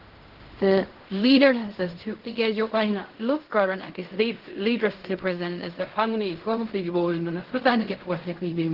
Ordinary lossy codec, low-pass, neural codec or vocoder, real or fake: Opus, 16 kbps; 5.4 kHz; codec, 16 kHz in and 24 kHz out, 0.4 kbps, LongCat-Audio-Codec, fine tuned four codebook decoder; fake